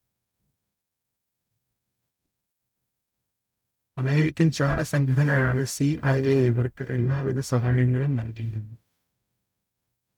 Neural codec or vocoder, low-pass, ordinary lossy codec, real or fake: codec, 44.1 kHz, 0.9 kbps, DAC; 19.8 kHz; none; fake